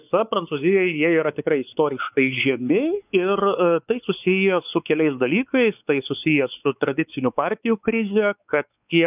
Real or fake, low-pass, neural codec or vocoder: fake; 3.6 kHz; codec, 16 kHz, 4 kbps, X-Codec, WavLM features, trained on Multilingual LibriSpeech